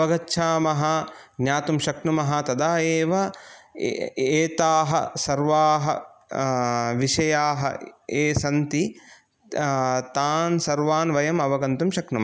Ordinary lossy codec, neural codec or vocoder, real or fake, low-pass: none; none; real; none